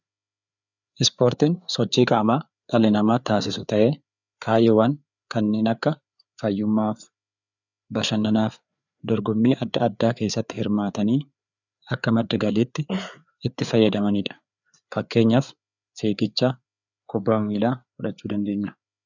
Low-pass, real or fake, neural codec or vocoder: 7.2 kHz; fake; codec, 16 kHz, 4 kbps, FreqCodec, larger model